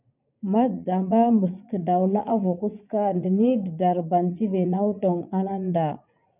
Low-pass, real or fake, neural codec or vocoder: 3.6 kHz; fake; vocoder, 44.1 kHz, 80 mel bands, Vocos